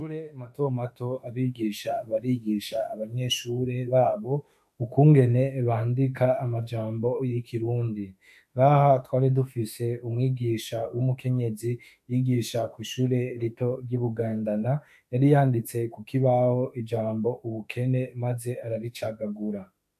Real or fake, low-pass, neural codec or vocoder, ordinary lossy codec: fake; 14.4 kHz; autoencoder, 48 kHz, 32 numbers a frame, DAC-VAE, trained on Japanese speech; AAC, 96 kbps